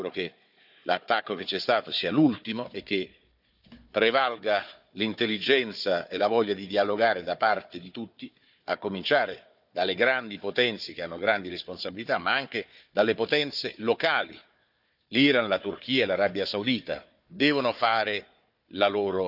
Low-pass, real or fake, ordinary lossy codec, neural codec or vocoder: 5.4 kHz; fake; none; codec, 16 kHz, 4 kbps, FunCodec, trained on Chinese and English, 50 frames a second